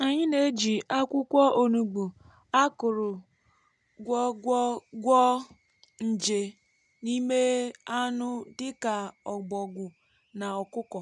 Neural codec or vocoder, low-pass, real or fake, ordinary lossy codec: none; 10.8 kHz; real; none